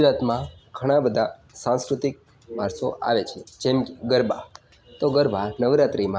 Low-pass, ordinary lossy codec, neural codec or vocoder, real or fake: none; none; none; real